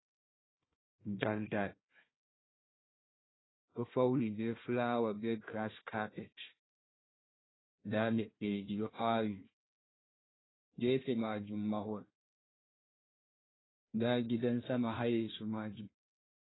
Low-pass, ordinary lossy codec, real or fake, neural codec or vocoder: 7.2 kHz; AAC, 16 kbps; fake; codec, 16 kHz, 1 kbps, FunCodec, trained on Chinese and English, 50 frames a second